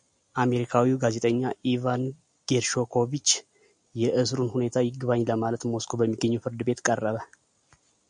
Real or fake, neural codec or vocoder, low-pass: real; none; 9.9 kHz